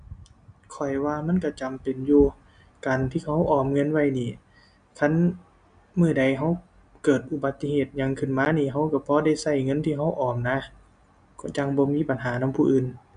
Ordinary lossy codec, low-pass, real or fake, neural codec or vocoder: none; 9.9 kHz; real; none